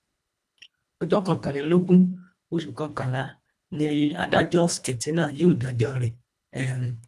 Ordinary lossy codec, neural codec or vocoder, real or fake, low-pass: none; codec, 24 kHz, 1.5 kbps, HILCodec; fake; none